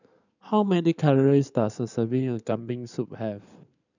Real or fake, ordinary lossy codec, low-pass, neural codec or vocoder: fake; none; 7.2 kHz; codec, 16 kHz in and 24 kHz out, 2.2 kbps, FireRedTTS-2 codec